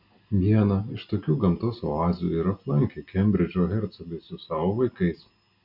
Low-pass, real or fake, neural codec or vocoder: 5.4 kHz; real; none